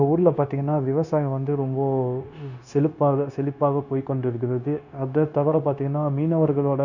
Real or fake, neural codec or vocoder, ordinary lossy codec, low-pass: fake; codec, 16 kHz, 0.9 kbps, LongCat-Audio-Codec; none; 7.2 kHz